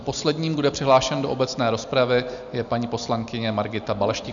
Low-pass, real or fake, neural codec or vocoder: 7.2 kHz; real; none